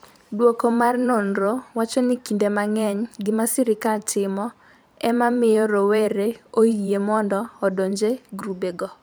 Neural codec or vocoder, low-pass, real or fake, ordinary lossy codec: vocoder, 44.1 kHz, 128 mel bands every 512 samples, BigVGAN v2; none; fake; none